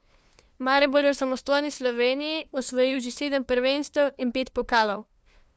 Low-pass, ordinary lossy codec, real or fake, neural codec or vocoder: none; none; fake; codec, 16 kHz, 2 kbps, FunCodec, trained on LibriTTS, 25 frames a second